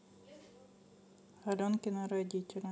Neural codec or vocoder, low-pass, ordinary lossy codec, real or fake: none; none; none; real